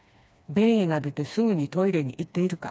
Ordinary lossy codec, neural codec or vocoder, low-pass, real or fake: none; codec, 16 kHz, 2 kbps, FreqCodec, smaller model; none; fake